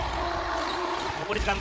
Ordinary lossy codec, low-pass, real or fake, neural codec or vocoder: none; none; fake; codec, 16 kHz, 8 kbps, FreqCodec, larger model